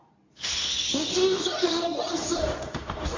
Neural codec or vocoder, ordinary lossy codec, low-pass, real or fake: codec, 24 kHz, 0.9 kbps, WavTokenizer, medium speech release version 2; AAC, 32 kbps; 7.2 kHz; fake